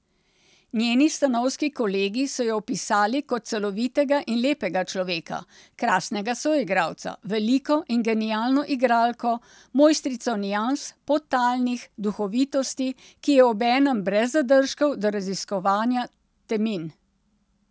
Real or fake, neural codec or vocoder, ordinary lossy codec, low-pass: real; none; none; none